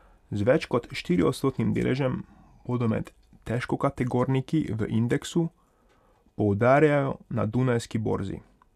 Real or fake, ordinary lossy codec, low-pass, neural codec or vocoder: real; none; 14.4 kHz; none